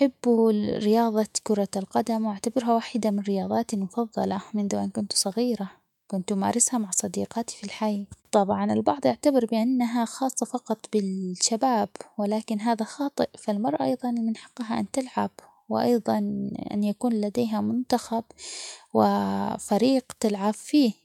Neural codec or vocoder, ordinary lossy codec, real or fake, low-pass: autoencoder, 48 kHz, 128 numbers a frame, DAC-VAE, trained on Japanese speech; MP3, 96 kbps; fake; 19.8 kHz